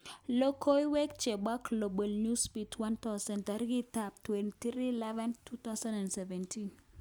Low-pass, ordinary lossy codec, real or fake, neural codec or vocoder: none; none; real; none